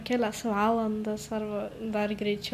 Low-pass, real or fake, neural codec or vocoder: 14.4 kHz; real; none